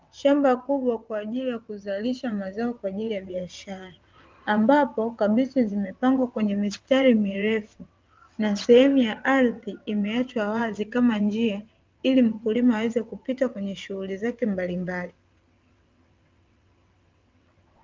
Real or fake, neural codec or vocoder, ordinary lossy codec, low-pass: fake; vocoder, 22.05 kHz, 80 mel bands, WaveNeXt; Opus, 24 kbps; 7.2 kHz